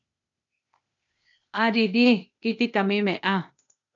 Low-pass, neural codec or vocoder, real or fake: 7.2 kHz; codec, 16 kHz, 0.8 kbps, ZipCodec; fake